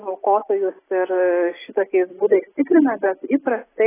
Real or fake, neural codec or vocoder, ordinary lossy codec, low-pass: real; none; AAC, 16 kbps; 3.6 kHz